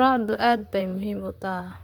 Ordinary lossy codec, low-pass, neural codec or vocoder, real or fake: MP3, 96 kbps; 19.8 kHz; vocoder, 44.1 kHz, 128 mel bands, Pupu-Vocoder; fake